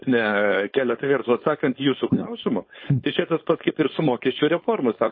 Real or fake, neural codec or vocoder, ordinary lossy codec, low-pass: fake; codec, 16 kHz, 4.8 kbps, FACodec; MP3, 24 kbps; 7.2 kHz